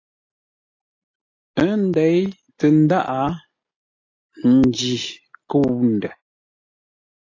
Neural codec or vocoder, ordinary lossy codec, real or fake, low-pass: none; AAC, 32 kbps; real; 7.2 kHz